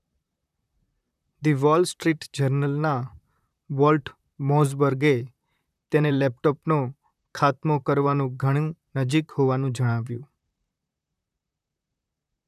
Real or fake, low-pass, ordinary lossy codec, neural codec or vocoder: fake; 14.4 kHz; none; vocoder, 44.1 kHz, 128 mel bands, Pupu-Vocoder